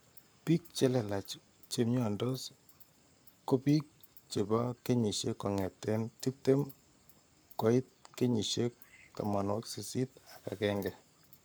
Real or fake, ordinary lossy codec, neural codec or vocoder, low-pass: fake; none; codec, 44.1 kHz, 7.8 kbps, Pupu-Codec; none